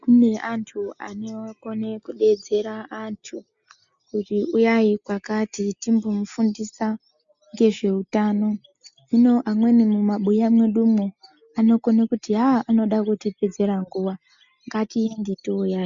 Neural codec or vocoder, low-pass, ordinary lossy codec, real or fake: none; 7.2 kHz; MP3, 64 kbps; real